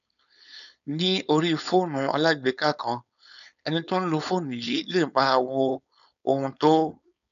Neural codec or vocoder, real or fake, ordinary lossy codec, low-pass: codec, 16 kHz, 4.8 kbps, FACodec; fake; none; 7.2 kHz